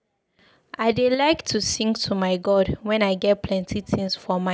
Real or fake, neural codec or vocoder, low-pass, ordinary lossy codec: real; none; none; none